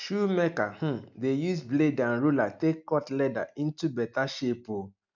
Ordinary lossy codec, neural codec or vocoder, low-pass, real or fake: none; none; 7.2 kHz; real